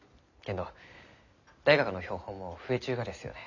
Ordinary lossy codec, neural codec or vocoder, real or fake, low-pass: none; none; real; 7.2 kHz